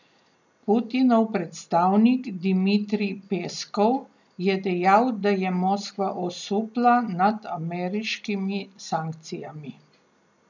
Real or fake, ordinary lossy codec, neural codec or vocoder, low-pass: real; none; none; 7.2 kHz